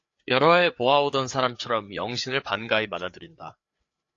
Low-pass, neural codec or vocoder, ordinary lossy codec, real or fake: 7.2 kHz; codec, 16 kHz, 4 kbps, FreqCodec, larger model; AAC, 48 kbps; fake